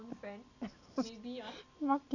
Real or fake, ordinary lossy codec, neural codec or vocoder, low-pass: real; none; none; 7.2 kHz